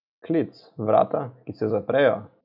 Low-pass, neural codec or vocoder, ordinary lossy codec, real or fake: 5.4 kHz; none; none; real